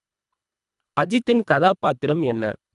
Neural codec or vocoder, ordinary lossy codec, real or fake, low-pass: codec, 24 kHz, 1.5 kbps, HILCodec; none; fake; 10.8 kHz